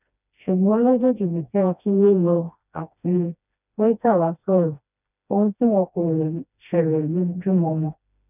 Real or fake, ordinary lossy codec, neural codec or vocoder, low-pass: fake; none; codec, 16 kHz, 1 kbps, FreqCodec, smaller model; 3.6 kHz